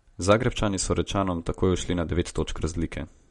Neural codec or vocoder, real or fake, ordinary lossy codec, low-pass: none; real; MP3, 48 kbps; 19.8 kHz